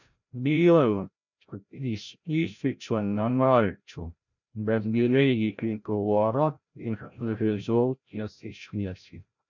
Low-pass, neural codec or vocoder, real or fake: 7.2 kHz; codec, 16 kHz, 0.5 kbps, FreqCodec, larger model; fake